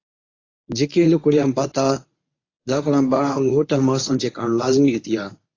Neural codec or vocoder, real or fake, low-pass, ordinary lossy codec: codec, 24 kHz, 0.9 kbps, WavTokenizer, medium speech release version 1; fake; 7.2 kHz; AAC, 32 kbps